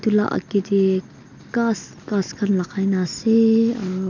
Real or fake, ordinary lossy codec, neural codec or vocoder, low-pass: real; none; none; 7.2 kHz